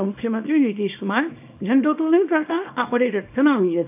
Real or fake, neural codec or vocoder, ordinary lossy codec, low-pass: fake; codec, 24 kHz, 0.9 kbps, WavTokenizer, small release; AAC, 32 kbps; 3.6 kHz